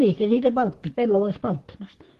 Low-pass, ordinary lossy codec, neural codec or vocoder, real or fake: 14.4 kHz; Opus, 16 kbps; codec, 44.1 kHz, 3.4 kbps, Pupu-Codec; fake